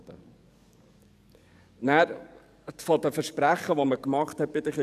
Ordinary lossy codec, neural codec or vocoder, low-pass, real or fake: none; codec, 44.1 kHz, 7.8 kbps, DAC; 14.4 kHz; fake